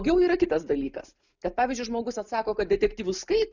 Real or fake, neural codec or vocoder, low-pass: real; none; 7.2 kHz